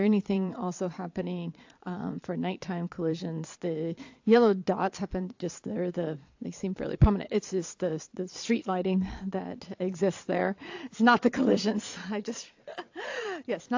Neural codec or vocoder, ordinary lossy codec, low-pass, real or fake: vocoder, 22.05 kHz, 80 mel bands, Vocos; AAC, 48 kbps; 7.2 kHz; fake